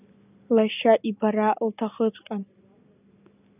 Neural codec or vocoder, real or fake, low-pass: none; real; 3.6 kHz